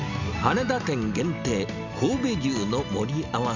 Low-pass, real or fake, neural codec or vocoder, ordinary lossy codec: 7.2 kHz; real; none; none